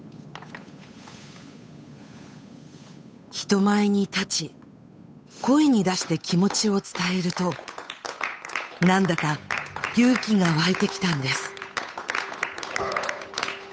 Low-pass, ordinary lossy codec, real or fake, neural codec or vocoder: none; none; fake; codec, 16 kHz, 8 kbps, FunCodec, trained on Chinese and English, 25 frames a second